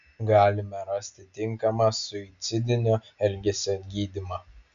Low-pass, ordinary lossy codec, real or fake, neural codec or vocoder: 7.2 kHz; MP3, 64 kbps; real; none